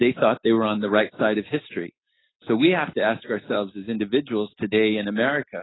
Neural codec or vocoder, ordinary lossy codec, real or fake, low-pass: none; AAC, 16 kbps; real; 7.2 kHz